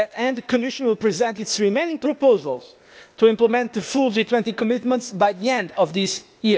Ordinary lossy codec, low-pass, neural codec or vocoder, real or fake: none; none; codec, 16 kHz, 0.8 kbps, ZipCodec; fake